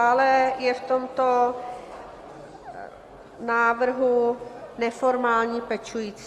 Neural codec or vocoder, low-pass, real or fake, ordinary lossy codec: none; 14.4 kHz; real; Opus, 32 kbps